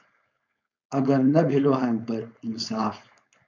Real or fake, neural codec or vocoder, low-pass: fake; codec, 16 kHz, 4.8 kbps, FACodec; 7.2 kHz